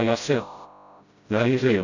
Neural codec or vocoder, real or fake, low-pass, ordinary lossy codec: codec, 16 kHz, 0.5 kbps, FreqCodec, smaller model; fake; 7.2 kHz; none